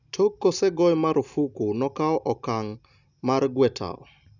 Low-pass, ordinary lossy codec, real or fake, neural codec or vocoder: 7.2 kHz; none; real; none